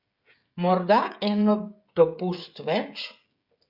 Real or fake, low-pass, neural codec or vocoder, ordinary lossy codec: fake; 5.4 kHz; codec, 16 kHz, 16 kbps, FreqCodec, smaller model; Opus, 64 kbps